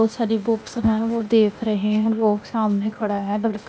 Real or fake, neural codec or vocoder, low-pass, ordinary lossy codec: fake; codec, 16 kHz, 0.8 kbps, ZipCodec; none; none